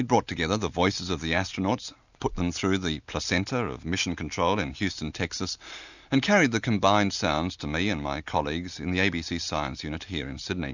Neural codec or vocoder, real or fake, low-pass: none; real; 7.2 kHz